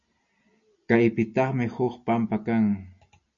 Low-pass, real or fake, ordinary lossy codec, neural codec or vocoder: 7.2 kHz; real; MP3, 96 kbps; none